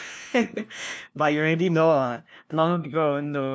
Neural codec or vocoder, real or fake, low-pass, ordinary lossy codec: codec, 16 kHz, 1 kbps, FunCodec, trained on LibriTTS, 50 frames a second; fake; none; none